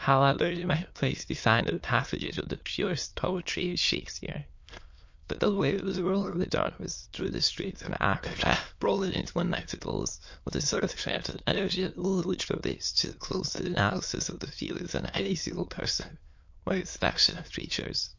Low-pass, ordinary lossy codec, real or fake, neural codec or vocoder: 7.2 kHz; MP3, 48 kbps; fake; autoencoder, 22.05 kHz, a latent of 192 numbers a frame, VITS, trained on many speakers